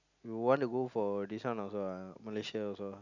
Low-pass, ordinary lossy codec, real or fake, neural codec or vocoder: 7.2 kHz; none; real; none